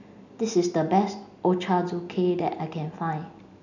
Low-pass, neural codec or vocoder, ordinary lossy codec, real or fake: 7.2 kHz; none; none; real